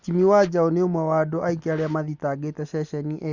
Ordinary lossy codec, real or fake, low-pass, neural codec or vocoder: Opus, 64 kbps; real; 7.2 kHz; none